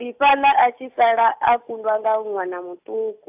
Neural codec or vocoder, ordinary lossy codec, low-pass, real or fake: none; AAC, 32 kbps; 3.6 kHz; real